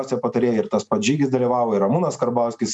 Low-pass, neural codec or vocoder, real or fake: 10.8 kHz; none; real